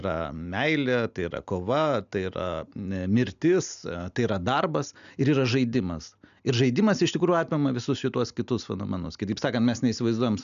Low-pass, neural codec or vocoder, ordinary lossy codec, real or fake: 7.2 kHz; none; MP3, 96 kbps; real